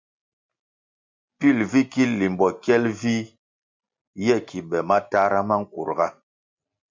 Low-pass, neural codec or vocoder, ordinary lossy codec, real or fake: 7.2 kHz; none; MP3, 64 kbps; real